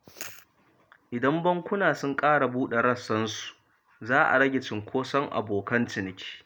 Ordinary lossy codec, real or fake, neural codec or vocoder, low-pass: none; real; none; none